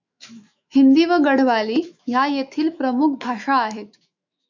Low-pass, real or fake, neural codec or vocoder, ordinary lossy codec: 7.2 kHz; fake; autoencoder, 48 kHz, 128 numbers a frame, DAC-VAE, trained on Japanese speech; MP3, 64 kbps